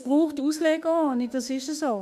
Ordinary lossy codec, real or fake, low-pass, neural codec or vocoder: AAC, 96 kbps; fake; 14.4 kHz; autoencoder, 48 kHz, 32 numbers a frame, DAC-VAE, trained on Japanese speech